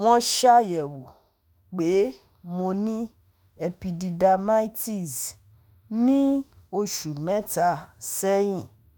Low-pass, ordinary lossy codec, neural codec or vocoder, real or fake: none; none; autoencoder, 48 kHz, 32 numbers a frame, DAC-VAE, trained on Japanese speech; fake